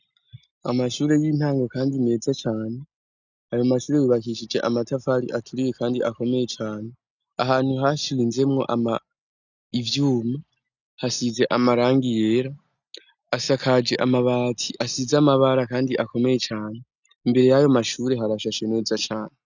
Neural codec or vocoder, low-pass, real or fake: none; 7.2 kHz; real